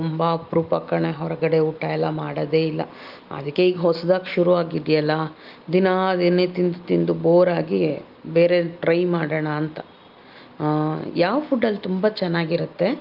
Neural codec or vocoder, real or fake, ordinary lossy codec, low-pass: none; real; Opus, 32 kbps; 5.4 kHz